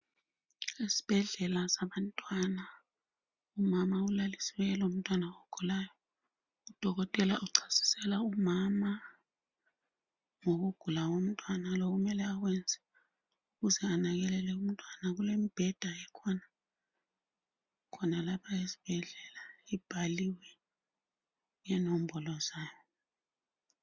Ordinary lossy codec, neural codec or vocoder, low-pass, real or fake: Opus, 64 kbps; none; 7.2 kHz; real